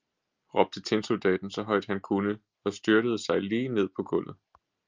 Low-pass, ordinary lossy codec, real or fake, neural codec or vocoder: 7.2 kHz; Opus, 32 kbps; real; none